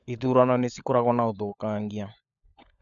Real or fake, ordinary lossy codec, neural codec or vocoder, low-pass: fake; none; codec, 16 kHz, 16 kbps, FunCodec, trained on LibriTTS, 50 frames a second; 7.2 kHz